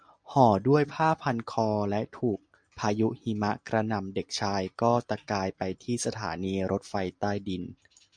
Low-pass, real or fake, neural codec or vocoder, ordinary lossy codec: 9.9 kHz; real; none; MP3, 64 kbps